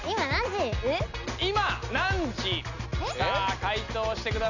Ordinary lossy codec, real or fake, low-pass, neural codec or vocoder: none; real; 7.2 kHz; none